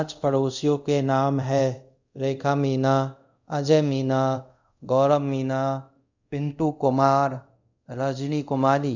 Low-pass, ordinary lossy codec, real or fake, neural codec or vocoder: 7.2 kHz; none; fake; codec, 24 kHz, 0.5 kbps, DualCodec